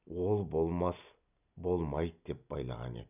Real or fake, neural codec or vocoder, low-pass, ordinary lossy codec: real; none; 3.6 kHz; none